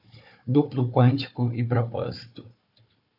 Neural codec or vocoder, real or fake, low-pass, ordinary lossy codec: codec, 16 kHz, 4 kbps, FunCodec, trained on Chinese and English, 50 frames a second; fake; 5.4 kHz; AAC, 48 kbps